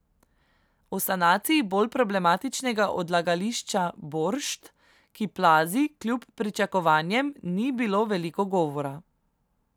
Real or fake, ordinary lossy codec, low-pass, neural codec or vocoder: real; none; none; none